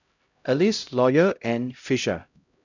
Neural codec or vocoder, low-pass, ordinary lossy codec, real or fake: codec, 16 kHz, 0.5 kbps, X-Codec, HuBERT features, trained on LibriSpeech; 7.2 kHz; none; fake